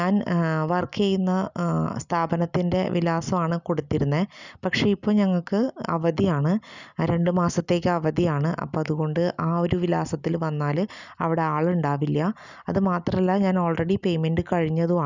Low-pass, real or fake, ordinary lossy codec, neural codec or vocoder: 7.2 kHz; real; none; none